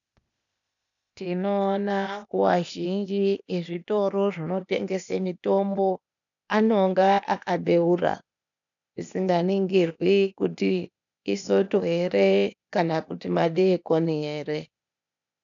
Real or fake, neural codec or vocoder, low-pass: fake; codec, 16 kHz, 0.8 kbps, ZipCodec; 7.2 kHz